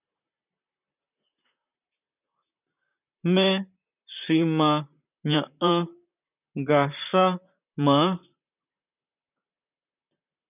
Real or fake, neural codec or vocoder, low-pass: fake; vocoder, 44.1 kHz, 128 mel bands, Pupu-Vocoder; 3.6 kHz